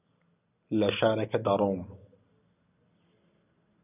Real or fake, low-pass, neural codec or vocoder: real; 3.6 kHz; none